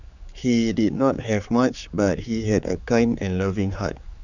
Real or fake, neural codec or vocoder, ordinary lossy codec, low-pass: fake; codec, 16 kHz, 4 kbps, X-Codec, HuBERT features, trained on general audio; none; 7.2 kHz